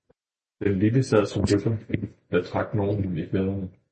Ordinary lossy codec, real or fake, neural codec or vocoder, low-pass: MP3, 32 kbps; real; none; 9.9 kHz